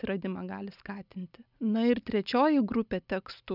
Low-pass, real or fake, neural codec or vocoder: 5.4 kHz; real; none